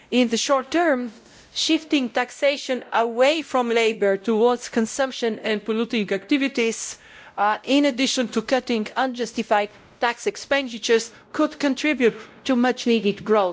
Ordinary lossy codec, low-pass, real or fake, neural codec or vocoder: none; none; fake; codec, 16 kHz, 0.5 kbps, X-Codec, WavLM features, trained on Multilingual LibriSpeech